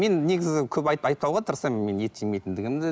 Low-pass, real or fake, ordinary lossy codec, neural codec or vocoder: none; real; none; none